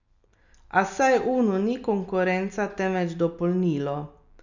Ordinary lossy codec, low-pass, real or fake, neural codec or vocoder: none; 7.2 kHz; real; none